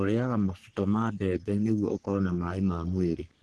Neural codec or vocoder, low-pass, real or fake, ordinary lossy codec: codec, 44.1 kHz, 3.4 kbps, Pupu-Codec; 10.8 kHz; fake; Opus, 16 kbps